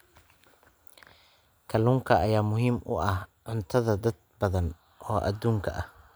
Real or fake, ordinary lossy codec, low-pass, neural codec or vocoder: real; none; none; none